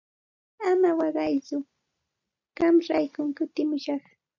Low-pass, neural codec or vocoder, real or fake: 7.2 kHz; none; real